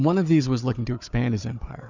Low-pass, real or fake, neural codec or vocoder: 7.2 kHz; fake; codec, 16 kHz, 4 kbps, FunCodec, trained on Chinese and English, 50 frames a second